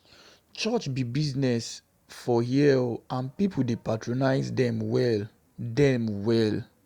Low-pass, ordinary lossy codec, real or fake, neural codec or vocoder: 19.8 kHz; Opus, 64 kbps; real; none